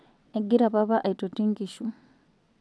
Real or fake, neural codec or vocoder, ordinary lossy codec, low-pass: fake; vocoder, 22.05 kHz, 80 mel bands, WaveNeXt; none; none